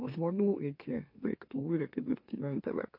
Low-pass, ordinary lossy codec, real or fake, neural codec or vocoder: 5.4 kHz; MP3, 32 kbps; fake; autoencoder, 44.1 kHz, a latent of 192 numbers a frame, MeloTTS